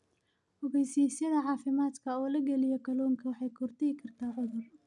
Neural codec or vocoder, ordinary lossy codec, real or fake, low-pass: none; none; real; 10.8 kHz